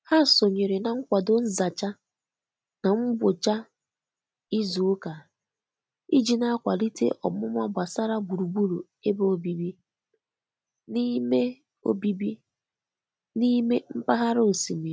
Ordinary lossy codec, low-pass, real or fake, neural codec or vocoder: none; none; real; none